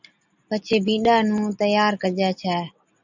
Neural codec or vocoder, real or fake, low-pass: none; real; 7.2 kHz